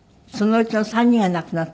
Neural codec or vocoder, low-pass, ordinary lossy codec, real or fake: none; none; none; real